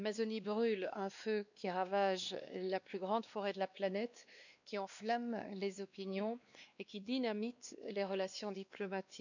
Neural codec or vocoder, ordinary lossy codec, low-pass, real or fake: codec, 16 kHz, 2 kbps, X-Codec, WavLM features, trained on Multilingual LibriSpeech; none; 7.2 kHz; fake